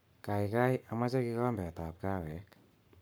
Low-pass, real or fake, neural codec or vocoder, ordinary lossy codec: none; fake; codec, 44.1 kHz, 7.8 kbps, Pupu-Codec; none